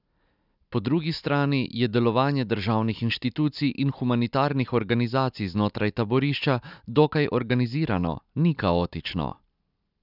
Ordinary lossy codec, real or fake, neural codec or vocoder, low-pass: none; real; none; 5.4 kHz